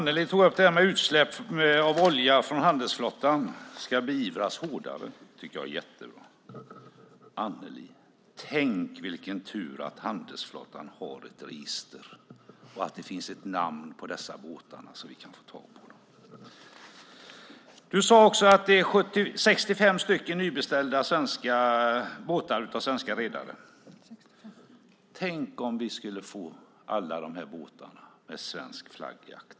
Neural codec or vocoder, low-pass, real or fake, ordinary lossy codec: none; none; real; none